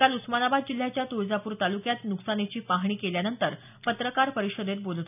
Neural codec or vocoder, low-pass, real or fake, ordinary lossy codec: none; 3.6 kHz; real; none